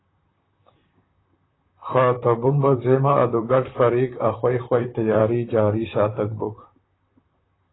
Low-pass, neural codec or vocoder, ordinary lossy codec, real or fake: 7.2 kHz; vocoder, 44.1 kHz, 128 mel bands, Pupu-Vocoder; AAC, 16 kbps; fake